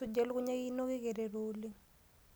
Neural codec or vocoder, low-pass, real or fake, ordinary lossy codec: none; none; real; none